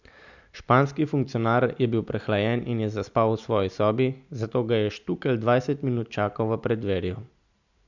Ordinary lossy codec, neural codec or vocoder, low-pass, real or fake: none; none; 7.2 kHz; real